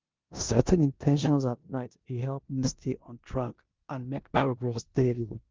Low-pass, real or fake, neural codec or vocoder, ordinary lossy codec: 7.2 kHz; fake; codec, 16 kHz in and 24 kHz out, 0.9 kbps, LongCat-Audio-Codec, four codebook decoder; Opus, 32 kbps